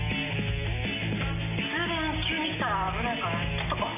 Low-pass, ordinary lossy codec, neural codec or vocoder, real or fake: 3.6 kHz; none; none; real